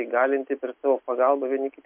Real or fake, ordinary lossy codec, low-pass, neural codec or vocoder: real; MP3, 32 kbps; 3.6 kHz; none